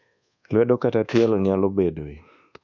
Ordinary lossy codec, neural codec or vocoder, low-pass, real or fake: none; codec, 24 kHz, 1.2 kbps, DualCodec; 7.2 kHz; fake